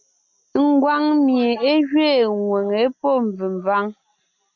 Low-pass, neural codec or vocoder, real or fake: 7.2 kHz; none; real